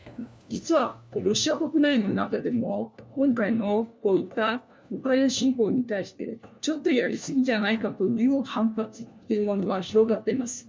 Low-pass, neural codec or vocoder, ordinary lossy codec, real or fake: none; codec, 16 kHz, 1 kbps, FunCodec, trained on LibriTTS, 50 frames a second; none; fake